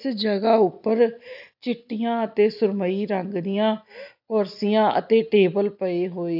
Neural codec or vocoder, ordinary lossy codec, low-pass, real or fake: none; AAC, 48 kbps; 5.4 kHz; real